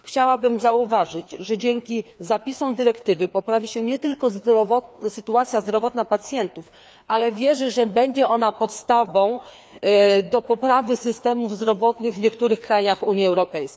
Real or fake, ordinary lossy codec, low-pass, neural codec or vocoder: fake; none; none; codec, 16 kHz, 2 kbps, FreqCodec, larger model